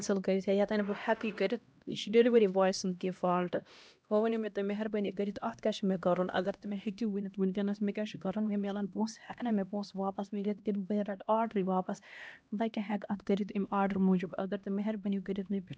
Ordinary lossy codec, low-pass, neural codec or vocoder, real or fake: none; none; codec, 16 kHz, 1 kbps, X-Codec, HuBERT features, trained on LibriSpeech; fake